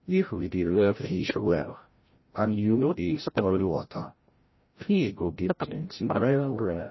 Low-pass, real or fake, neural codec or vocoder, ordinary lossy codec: 7.2 kHz; fake; codec, 16 kHz, 0.5 kbps, FreqCodec, larger model; MP3, 24 kbps